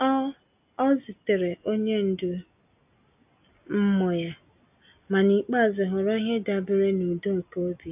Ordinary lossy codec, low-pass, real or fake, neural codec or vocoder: AAC, 32 kbps; 3.6 kHz; real; none